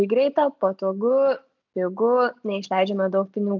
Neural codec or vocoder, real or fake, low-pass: none; real; 7.2 kHz